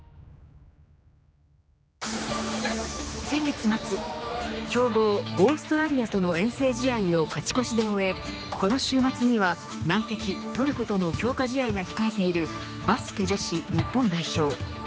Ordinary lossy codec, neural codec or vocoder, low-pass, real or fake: none; codec, 16 kHz, 2 kbps, X-Codec, HuBERT features, trained on general audio; none; fake